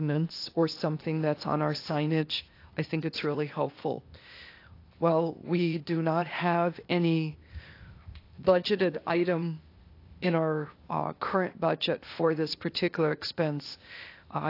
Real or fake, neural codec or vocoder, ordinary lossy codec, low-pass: fake; codec, 16 kHz, 0.8 kbps, ZipCodec; AAC, 32 kbps; 5.4 kHz